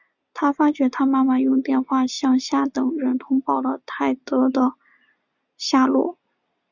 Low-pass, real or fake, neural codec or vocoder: 7.2 kHz; real; none